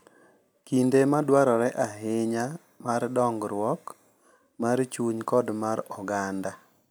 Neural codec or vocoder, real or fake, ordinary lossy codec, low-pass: none; real; none; none